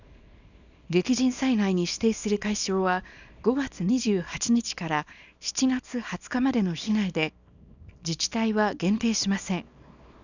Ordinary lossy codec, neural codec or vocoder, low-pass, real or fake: none; codec, 24 kHz, 0.9 kbps, WavTokenizer, small release; 7.2 kHz; fake